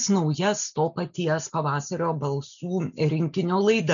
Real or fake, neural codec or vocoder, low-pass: real; none; 7.2 kHz